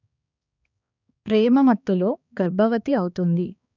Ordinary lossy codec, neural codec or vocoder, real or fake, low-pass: none; codec, 16 kHz, 2 kbps, X-Codec, HuBERT features, trained on balanced general audio; fake; 7.2 kHz